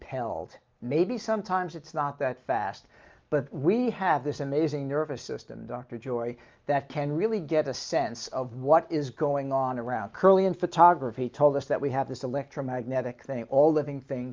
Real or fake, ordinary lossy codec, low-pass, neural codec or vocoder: real; Opus, 24 kbps; 7.2 kHz; none